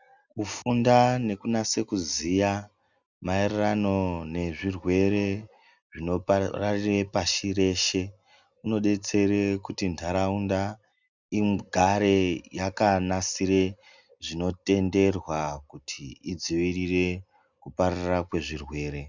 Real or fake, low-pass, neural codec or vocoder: real; 7.2 kHz; none